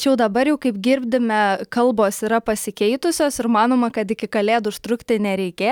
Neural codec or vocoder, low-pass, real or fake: none; 19.8 kHz; real